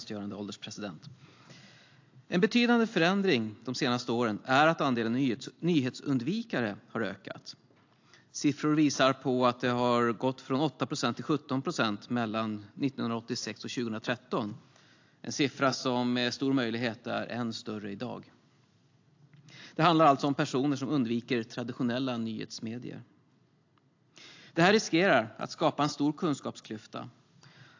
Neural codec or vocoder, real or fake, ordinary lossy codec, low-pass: none; real; AAC, 48 kbps; 7.2 kHz